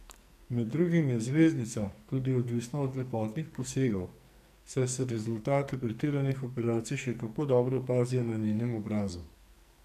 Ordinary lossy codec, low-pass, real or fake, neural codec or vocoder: none; 14.4 kHz; fake; codec, 44.1 kHz, 2.6 kbps, SNAC